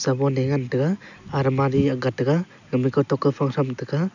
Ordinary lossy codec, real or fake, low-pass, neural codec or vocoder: none; real; 7.2 kHz; none